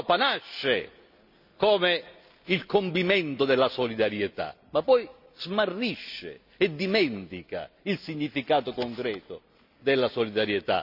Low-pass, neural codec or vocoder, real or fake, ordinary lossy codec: 5.4 kHz; none; real; none